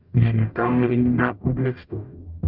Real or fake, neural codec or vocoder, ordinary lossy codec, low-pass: fake; codec, 44.1 kHz, 0.9 kbps, DAC; Opus, 24 kbps; 5.4 kHz